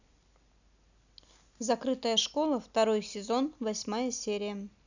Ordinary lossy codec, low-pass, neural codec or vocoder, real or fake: none; 7.2 kHz; none; real